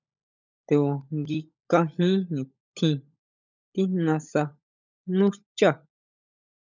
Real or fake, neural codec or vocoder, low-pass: fake; codec, 16 kHz, 16 kbps, FunCodec, trained on LibriTTS, 50 frames a second; 7.2 kHz